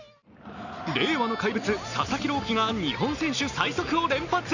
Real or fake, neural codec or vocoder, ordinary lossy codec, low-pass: real; none; none; 7.2 kHz